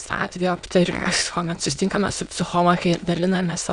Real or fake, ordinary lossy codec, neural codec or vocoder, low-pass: fake; Opus, 64 kbps; autoencoder, 22.05 kHz, a latent of 192 numbers a frame, VITS, trained on many speakers; 9.9 kHz